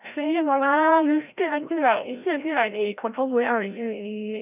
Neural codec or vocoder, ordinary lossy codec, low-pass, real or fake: codec, 16 kHz, 0.5 kbps, FreqCodec, larger model; none; 3.6 kHz; fake